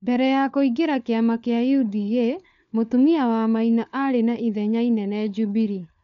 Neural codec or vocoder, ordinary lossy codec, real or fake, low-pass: codec, 16 kHz, 4 kbps, FunCodec, trained on LibriTTS, 50 frames a second; none; fake; 7.2 kHz